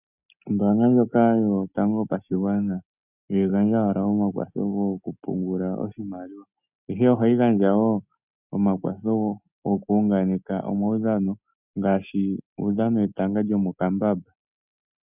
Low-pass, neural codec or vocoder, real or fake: 3.6 kHz; none; real